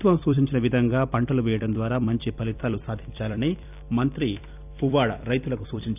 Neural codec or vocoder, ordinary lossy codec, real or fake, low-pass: vocoder, 44.1 kHz, 128 mel bands every 256 samples, BigVGAN v2; none; fake; 3.6 kHz